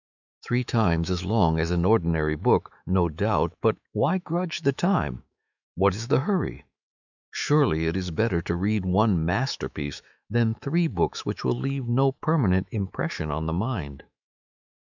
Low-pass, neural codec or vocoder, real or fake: 7.2 kHz; autoencoder, 48 kHz, 128 numbers a frame, DAC-VAE, trained on Japanese speech; fake